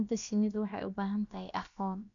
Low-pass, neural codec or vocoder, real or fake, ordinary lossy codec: 7.2 kHz; codec, 16 kHz, about 1 kbps, DyCAST, with the encoder's durations; fake; none